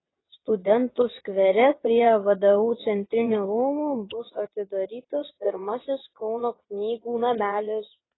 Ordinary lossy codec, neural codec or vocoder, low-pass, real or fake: AAC, 16 kbps; vocoder, 22.05 kHz, 80 mel bands, WaveNeXt; 7.2 kHz; fake